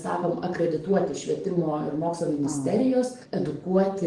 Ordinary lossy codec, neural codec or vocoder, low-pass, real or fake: Opus, 24 kbps; codec, 44.1 kHz, 7.8 kbps, DAC; 10.8 kHz; fake